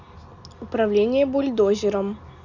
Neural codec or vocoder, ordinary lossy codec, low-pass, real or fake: none; none; 7.2 kHz; real